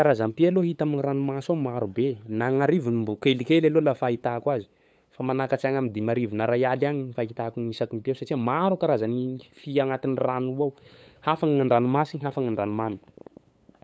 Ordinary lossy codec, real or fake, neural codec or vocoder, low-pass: none; fake; codec, 16 kHz, 8 kbps, FunCodec, trained on LibriTTS, 25 frames a second; none